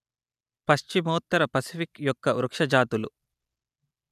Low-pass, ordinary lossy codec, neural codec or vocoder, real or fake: 14.4 kHz; none; none; real